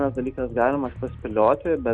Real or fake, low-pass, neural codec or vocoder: real; 9.9 kHz; none